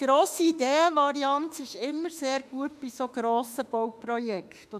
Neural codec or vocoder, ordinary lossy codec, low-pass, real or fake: autoencoder, 48 kHz, 32 numbers a frame, DAC-VAE, trained on Japanese speech; none; 14.4 kHz; fake